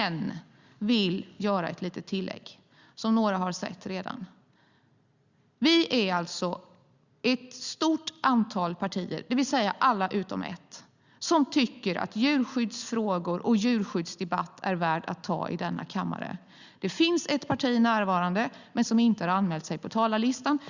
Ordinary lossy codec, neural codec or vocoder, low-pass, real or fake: Opus, 64 kbps; none; 7.2 kHz; real